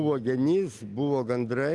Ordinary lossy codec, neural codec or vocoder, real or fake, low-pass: Opus, 24 kbps; none; real; 10.8 kHz